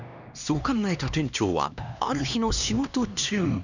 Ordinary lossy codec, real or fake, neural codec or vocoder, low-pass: none; fake; codec, 16 kHz, 2 kbps, X-Codec, HuBERT features, trained on LibriSpeech; 7.2 kHz